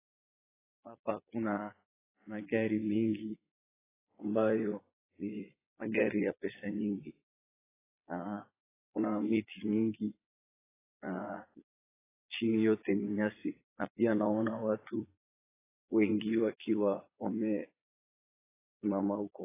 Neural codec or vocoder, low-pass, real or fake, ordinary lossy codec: vocoder, 22.05 kHz, 80 mel bands, Vocos; 3.6 kHz; fake; AAC, 16 kbps